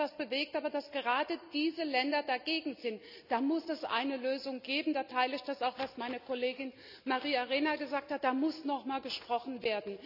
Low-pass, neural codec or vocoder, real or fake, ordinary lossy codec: 5.4 kHz; none; real; none